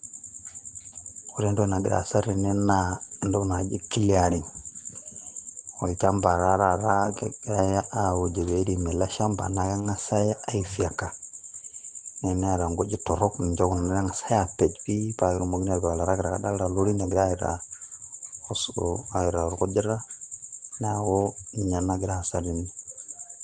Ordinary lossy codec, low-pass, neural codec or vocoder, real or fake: Opus, 16 kbps; 9.9 kHz; none; real